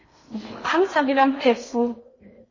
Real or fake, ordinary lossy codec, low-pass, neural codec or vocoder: fake; MP3, 32 kbps; 7.2 kHz; codec, 16 kHz in and 24 kHz out, 0.8 kbps, FocalCodec, streaming, 65536 codes